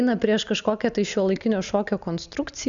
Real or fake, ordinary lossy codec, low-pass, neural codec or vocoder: real; Opus, 64 kbps; 7.2 kHz; none